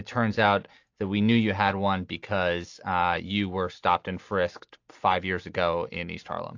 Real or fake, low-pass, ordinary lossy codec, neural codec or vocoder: real; 7.2 kHz; AAC, 48 kbps; none